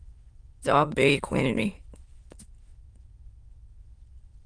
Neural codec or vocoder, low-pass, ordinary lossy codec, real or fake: autoencoder, 22.05 kHz, a latent of 192 numbers a frame, VITS, trained on many speakers; 9.9 kHz; Opus, 24 kbps; fake